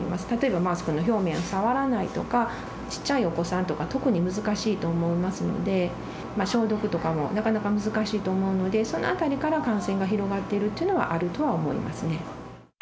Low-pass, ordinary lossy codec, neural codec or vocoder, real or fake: none; none; none; real